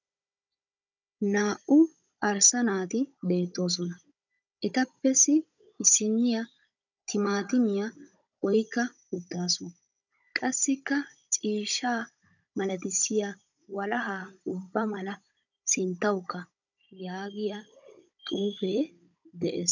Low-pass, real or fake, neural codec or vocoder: 7.2 kHz; fake; codec, 16 kHz, 16 kbps, FunCodec, trained on Chinese and English, 50 frames a second